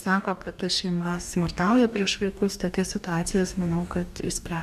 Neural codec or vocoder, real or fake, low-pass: codec, 44.1 kHz, 2.6 kbps, DAC; fake; 14.4 kHz